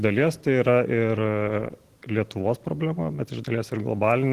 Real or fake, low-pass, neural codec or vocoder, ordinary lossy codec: real; 14.4 kHz; none; Opus, 16 kbps